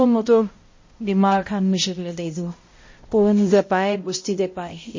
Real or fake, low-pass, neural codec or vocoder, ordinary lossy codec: fake; 7.2 kHz; codec, 16 kHz, 0.5 kbps, X-Codec, HuBERT features, trained on balanced general audio; MP3, 32 kbps